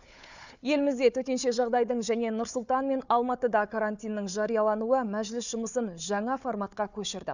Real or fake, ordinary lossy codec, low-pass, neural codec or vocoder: fake; none; 7.2 kHz; codec, 16 kHz, 4 kbps, FunCodec, trained on Chinese and English, 50 frames a second